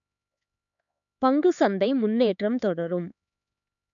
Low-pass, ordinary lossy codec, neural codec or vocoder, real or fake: 7.2 kHz; none; codec, 16 kHz, 4 kbps, X-Codec, HuBERT features, trained on LibriSpeech; fake